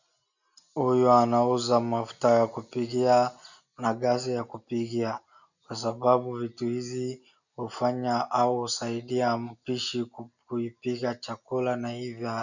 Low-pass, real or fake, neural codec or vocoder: 7.2 kHz; real; none